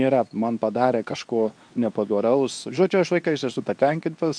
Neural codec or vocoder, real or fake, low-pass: codec, 24 kHz, 0.9 kbps, WavTokenizer, medium speech release version 2; fake; 9.9 kHz